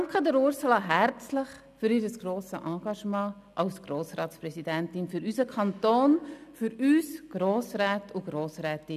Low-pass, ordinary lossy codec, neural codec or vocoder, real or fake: 14.4 kHz; none; none; real